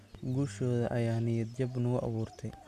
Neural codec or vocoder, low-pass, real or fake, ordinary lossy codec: none; 14.4 kHz; real; none